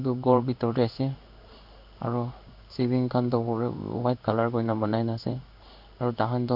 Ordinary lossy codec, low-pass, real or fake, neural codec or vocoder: none; 5.4 kHz; fake; codec, 16 kHz in and 24 kHz out, 1 kbps, XY-Tokenizer